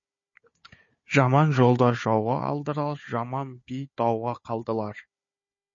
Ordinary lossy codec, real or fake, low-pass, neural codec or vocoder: MP3, 32 kbps; fake; 7.2 kHz; codec, 16 kHz, 4 kbps, FunCodec, trained on Chinese and English, 50 frames a second